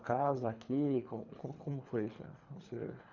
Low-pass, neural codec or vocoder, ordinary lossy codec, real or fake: 7.2 kHz; codec, 24 kHz, 3 kbps, HILCodec; none; fake